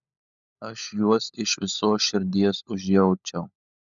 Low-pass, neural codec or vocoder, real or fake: 7.2 kHz; codec, 16 kHz, 16 kbps, FunCodec, trained on LibriTTS, 50 frames a second; fake